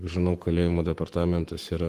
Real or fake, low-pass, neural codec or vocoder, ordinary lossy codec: fake; 14.4 kHz; codec, 44.1 kHz, 7.8 kbps, Pupu-Codec; Opus, 24 kbps